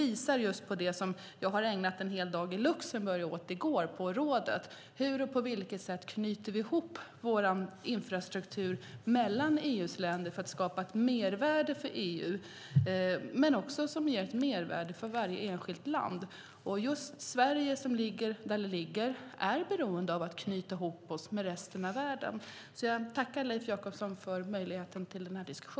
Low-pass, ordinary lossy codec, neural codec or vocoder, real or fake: none; none; none; real